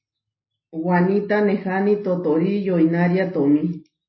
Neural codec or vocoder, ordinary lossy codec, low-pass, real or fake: none; MP3, 24 kbps; 7.2 kHz; real